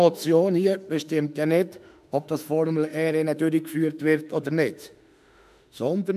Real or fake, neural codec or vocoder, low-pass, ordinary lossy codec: fake; autoencoder, 48 kHz, 32 numbers a frame, DAC-VAE, trained on Japanese speech; 14.4 kHz; none